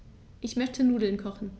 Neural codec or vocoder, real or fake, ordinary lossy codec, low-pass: none; real; none; none